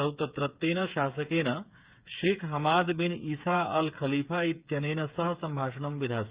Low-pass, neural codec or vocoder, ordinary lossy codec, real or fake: 3.6 kHz; codec, 16 kHz, 8 kbps, FreqCodec, smaller model; Opus, 64 kbps; fake